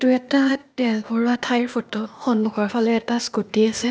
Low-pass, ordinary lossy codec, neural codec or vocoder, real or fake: none; none; codec, 16 kHz, 0.8 kbps, ZipCodec; fake